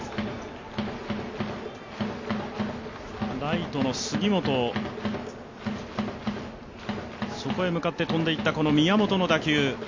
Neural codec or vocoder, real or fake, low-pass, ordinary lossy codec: none; real; 7.2 kHz; MP3, 64 kbps